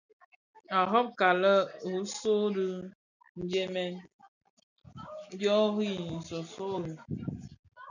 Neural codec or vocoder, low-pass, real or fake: none; 7.2 kHz; real